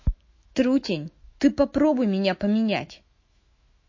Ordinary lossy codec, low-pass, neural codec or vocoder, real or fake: MP3, 32 kbps; 7.2 kHz; autoencoder, 48 kHz, 128 numbers a frame, DAC-VAE, trained on Japanese speech; fake